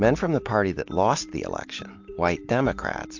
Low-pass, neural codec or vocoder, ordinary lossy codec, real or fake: 7.2 kHz; none; MP3, 48 kbps; real